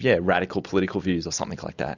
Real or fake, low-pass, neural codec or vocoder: real; 7.2 kHz; none